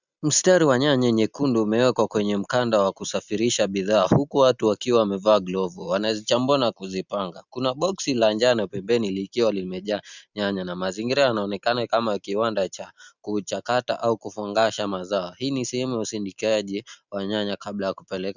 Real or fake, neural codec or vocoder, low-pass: real; none; 7.2 kHz